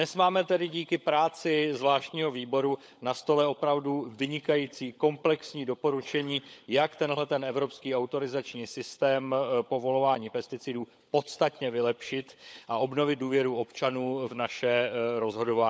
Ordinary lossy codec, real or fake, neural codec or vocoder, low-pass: none; fake; codec, 16 kHz, 16 kbps, FunCodec, trained on LibriTTS, 50 frames a second; none